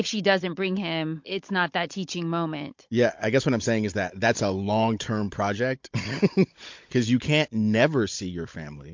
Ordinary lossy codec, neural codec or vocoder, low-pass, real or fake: MP3, 48 kbps; codec, 16 kHz, 16 kbps, FunCodec, trained on Chinese and English, 50 frames a second; 7.2 kHz; fake